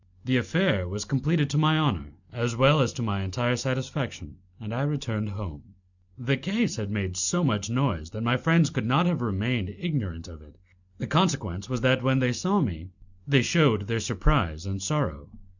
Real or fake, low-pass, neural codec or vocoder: real; 7.2 kHz; none